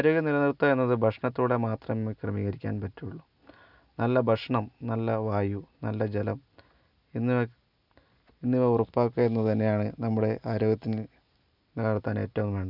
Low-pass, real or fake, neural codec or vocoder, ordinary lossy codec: 5.4 kHz; real; none; none